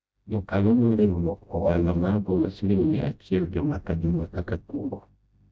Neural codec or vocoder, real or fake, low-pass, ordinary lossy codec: codec, 16 kHz, 0.5 kbps, FreqCodec, smaller model; fake; none; none